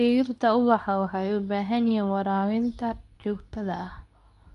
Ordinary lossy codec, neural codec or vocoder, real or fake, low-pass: none; codec, 24 kHz, 0.9 kbps, WavTokenizer, medium speech release version 2; fake; 10.8 kHz